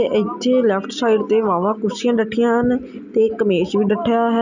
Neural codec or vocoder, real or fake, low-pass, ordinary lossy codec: none; real; 7.2 kHz; none